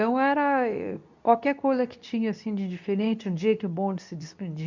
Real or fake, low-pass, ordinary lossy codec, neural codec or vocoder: fake; 7.2 kHz; none; codec, 24 kHz, 0.9 kbps, WavTokenizer, medium speech release version 2